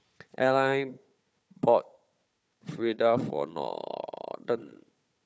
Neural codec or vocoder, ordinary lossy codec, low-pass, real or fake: codec, 16 kHz, 4 kbps, FunCodec, trained on Chinese and English, 50 frames a second; none; none; fake